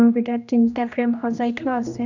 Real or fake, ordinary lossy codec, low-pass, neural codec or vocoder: fake; none; 7.2 kHz; codec, 16 kHz, 1 kbps, X-Codec, HuBERT features, trained on general audio